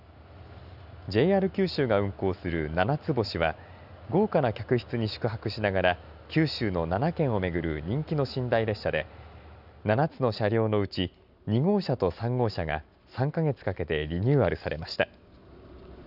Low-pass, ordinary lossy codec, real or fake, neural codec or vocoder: 5.4 kHz; none; real; none